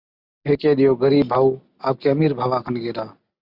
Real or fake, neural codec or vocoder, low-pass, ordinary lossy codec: real; none; 5.4 kHz; Opus, 64 kbps